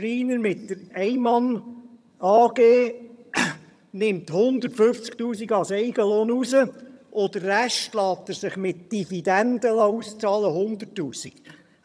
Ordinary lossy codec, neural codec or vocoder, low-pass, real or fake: none; vocoder, 22.05 kHz, 80 mel bands, HiFi-GAN; none; fake